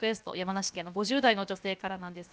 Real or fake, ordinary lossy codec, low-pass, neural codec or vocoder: fake; none; none; codec, 16 kHz, 0.7 kbps, FocalCodec